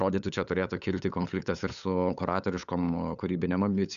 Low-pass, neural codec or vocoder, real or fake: 7.2 kHz; codec, 16 kHz, 8 kbps, FunCodec, trained on LibriTTS, 25 frames a second; fake